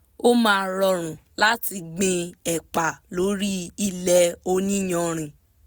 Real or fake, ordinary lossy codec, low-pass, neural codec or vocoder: real; none; none; none